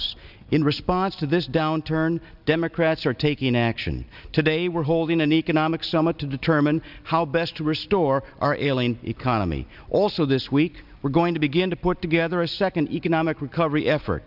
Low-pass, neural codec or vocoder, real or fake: 5.4 kHz; none; real